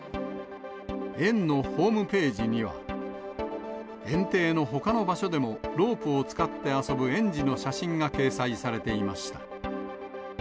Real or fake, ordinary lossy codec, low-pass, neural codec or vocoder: real; none; none; none